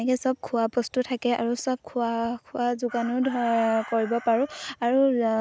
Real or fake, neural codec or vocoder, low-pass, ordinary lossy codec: real; none; none; none